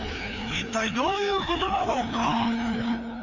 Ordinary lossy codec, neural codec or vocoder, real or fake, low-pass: none; codec, 16 kHz, 2 kbps, FreqCodec, larger model; fake; 7.2 kHz